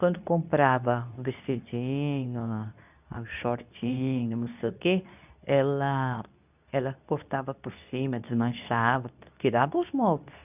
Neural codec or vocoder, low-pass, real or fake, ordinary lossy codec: codec, 24 kHz, 0.9 kbps, WavTokenizer, medium speech release version 2; 3.6 kHz; fake; none